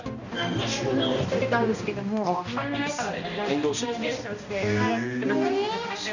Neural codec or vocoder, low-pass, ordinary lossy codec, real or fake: codec, 16 kHz, 1 kbps, X-Codec, HuBERT features, trained on balanced general audio; 7.2 kHz; none; fake